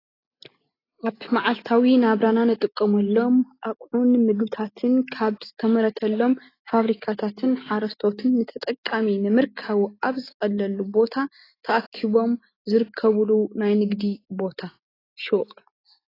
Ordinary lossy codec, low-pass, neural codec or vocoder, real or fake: AAC, 24 kbps; 5.4 kHz; none; real